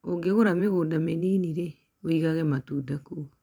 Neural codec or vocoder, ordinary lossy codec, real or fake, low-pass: vocoder, 44.1 kHz, 128 mel bands every 512 samples, BigVGAN v2; none; fake; 19.8 kHz